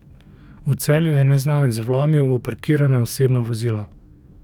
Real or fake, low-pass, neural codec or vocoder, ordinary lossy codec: fake; 19.8 kHz; codec, 44.1 kHz, 2.6 kbps, DAC; none